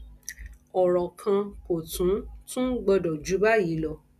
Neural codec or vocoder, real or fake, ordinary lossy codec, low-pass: none; real; none; 14.4 kHz